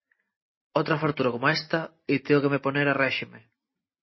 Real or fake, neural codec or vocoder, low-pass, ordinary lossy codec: real; none; 7.2 kHz; MP3, 24 kbps